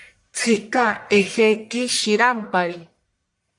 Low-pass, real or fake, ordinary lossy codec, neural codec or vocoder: 10.8 kHz; fake; AAC, 64 kbps; codec, 44.1 kHz, 1.7 kbps, Pupu-Codec